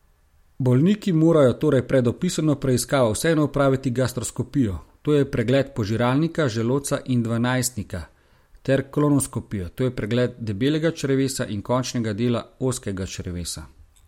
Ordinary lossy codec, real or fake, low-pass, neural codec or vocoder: MP3, 64 kbps; real; 19.8 kHz; none